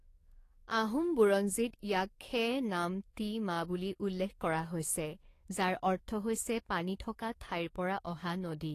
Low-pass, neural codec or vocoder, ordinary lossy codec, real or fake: 14.4 kHz; codec, 44.1 kHz, 7.8 kbps, DAC; AAC, 48 kbps; fake